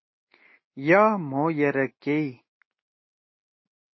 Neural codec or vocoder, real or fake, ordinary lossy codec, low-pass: none; real; MP3, 24 kbps; 7.2 kHz